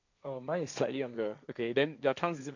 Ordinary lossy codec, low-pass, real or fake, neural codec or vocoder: none; 7.2 kHz; fake; codec, 16 kHz, 1.1 kbps, Voila-Tokenizer